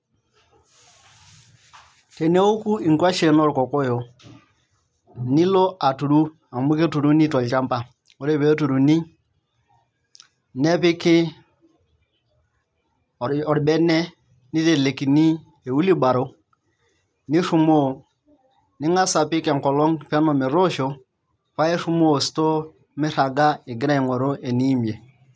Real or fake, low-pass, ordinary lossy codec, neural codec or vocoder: real; none; none; none